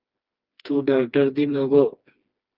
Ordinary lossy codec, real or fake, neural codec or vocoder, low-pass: Opus, 32 kbps; fake; codec, 16 kHz, 2 kbps, FreqCodec, smaller model; 5.4 kHz